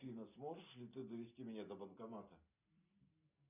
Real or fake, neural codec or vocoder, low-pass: real; none; 3.6 kHz